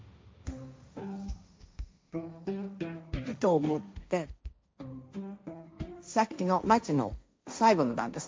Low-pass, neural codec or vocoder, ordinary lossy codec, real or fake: none; codec, 16 kHz, 1.1 kbps, Voila-Tokenizer; none; fake